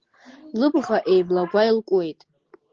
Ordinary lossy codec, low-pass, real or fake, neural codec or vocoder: Opus, 16 kbps; 7.2 kHz; real; none